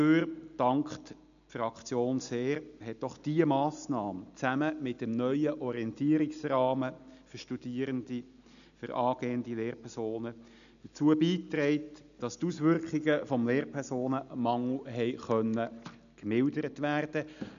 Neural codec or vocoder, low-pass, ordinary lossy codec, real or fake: none; 7.2 kHz; none; real